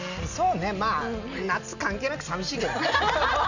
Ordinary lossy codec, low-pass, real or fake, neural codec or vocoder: none; 7.2 kHz; real; none